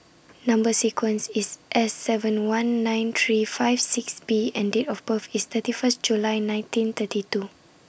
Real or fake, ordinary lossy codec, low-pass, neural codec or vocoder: real; none; none; none